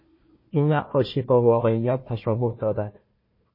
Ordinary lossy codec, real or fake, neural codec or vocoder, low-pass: MP3, 24 kbps; fake; codec, 16 kHz, 1 kbps, FunCodec, trained on Chinese and English, 50 frames a second; 5.4 kHz